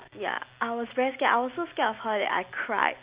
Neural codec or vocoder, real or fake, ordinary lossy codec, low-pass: none; real; Opus, 64 kbps; 3.6 kHz